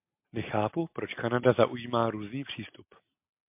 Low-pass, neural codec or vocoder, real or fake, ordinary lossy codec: 3.6 kHz; none; real; MP3, 32 kbps